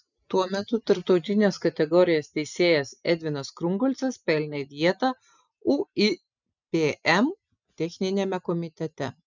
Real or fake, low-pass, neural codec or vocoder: real; 7.2 kHz; none